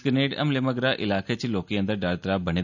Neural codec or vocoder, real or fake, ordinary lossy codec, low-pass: none; real; none; 7.2 kHz